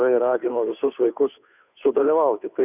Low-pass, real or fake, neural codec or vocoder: 3.6 kHz; fake; codec, 16 kHz, 2 kbps, FunCodec, trained on Chinese and English, 25 frames a second